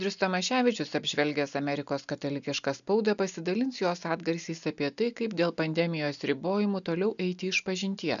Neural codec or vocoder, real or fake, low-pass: none; real; 7.2 kHz